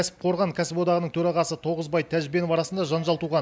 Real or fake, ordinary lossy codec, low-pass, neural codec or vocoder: real; none; none; none